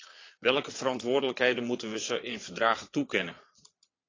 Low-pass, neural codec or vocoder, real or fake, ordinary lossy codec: 7.2 kHz; vocoder, 22.05 kHz, 80 mel bands, Vocos; fake; AAC, 32 kbps